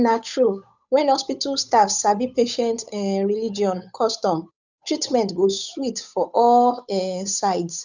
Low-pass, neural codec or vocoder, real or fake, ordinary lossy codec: 7.2 kHz; codec, 16 kHz, 8 kbps, FunCodec, trained on Chinese and English, 25 frames a second; fake; none